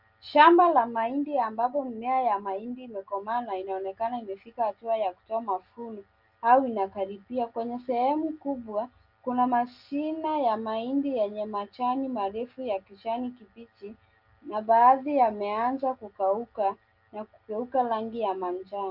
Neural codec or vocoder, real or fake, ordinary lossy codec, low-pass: none; real; Opus, 24 kbps; 5.4 kHz